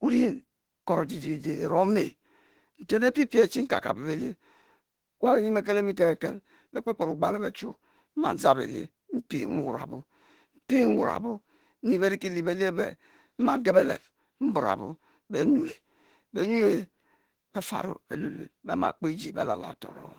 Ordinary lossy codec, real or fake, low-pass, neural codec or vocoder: Opus, 16 kbps; fake; 19.8 kHz; autoencoder, 48 kHz, 32 numbers a frame, DAC-VAE, trained on Japanese speech